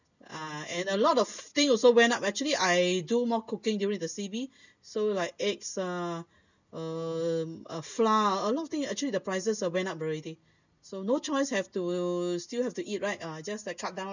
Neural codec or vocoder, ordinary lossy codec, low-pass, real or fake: none; none; 7.2 kHz; real